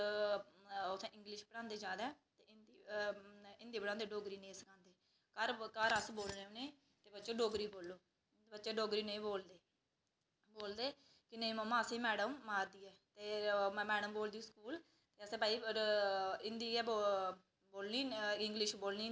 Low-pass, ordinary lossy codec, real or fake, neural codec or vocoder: none; none; real; none